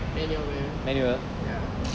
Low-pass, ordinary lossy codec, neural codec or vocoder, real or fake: none; none; none; real